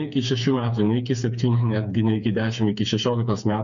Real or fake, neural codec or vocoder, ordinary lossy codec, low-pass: fake; codec, 16 kHz, 4 kbps, FreqCodec, smaller model; MP3, 96 kbps; 7.2 kHz